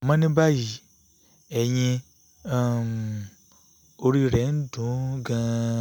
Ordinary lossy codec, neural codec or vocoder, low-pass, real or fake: none; none; none; real